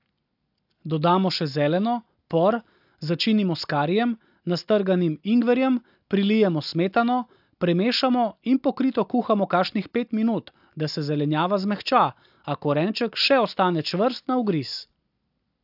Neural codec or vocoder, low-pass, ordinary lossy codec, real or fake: none; 5.4 kHz; AAC, 48 kbps; real